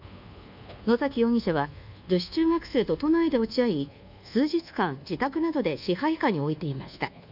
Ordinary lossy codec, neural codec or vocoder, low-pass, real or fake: none; codec, 24 kHz, 1.2 kbps, DualCodec; 5.4 kHz; fake